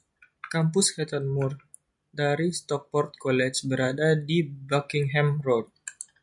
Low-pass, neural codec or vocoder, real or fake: 10.8 kHz; none; real